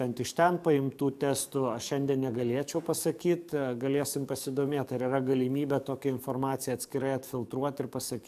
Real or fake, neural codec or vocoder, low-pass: fake; codec, 44.1 kHz, 7.8 kbps, DAC; 14.4 kHz